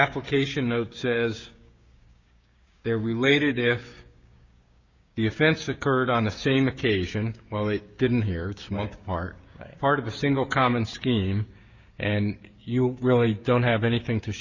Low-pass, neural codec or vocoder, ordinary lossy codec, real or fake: 7.2 kHz; codec, 16 kHz, 6 kbps, DAC; Opus, 64 kbps; fake